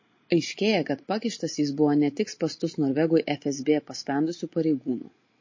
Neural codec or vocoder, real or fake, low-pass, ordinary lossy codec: none; real; 7.2 kHz; MP3, 32 kbps